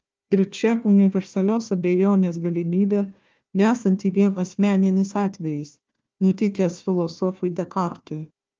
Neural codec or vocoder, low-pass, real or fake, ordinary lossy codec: codec, 16 kHz, 1 kbps, FunCodec, trained on Chinese and English, 50 frames a second; 7.2 kHz; fake; Opus, 24 kbps